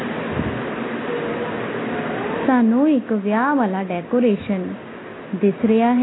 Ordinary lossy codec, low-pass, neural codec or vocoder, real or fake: AAC, 16 kbps; 7.2 kHz; none; real